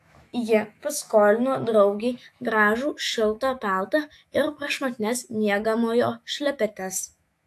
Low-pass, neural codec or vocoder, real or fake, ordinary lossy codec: 14.4 kHz; autoencoder, 48 kHz, 128 numbers a frame, DAC-VAE, trained on Japanese speech; fake; AAC, 64 kbps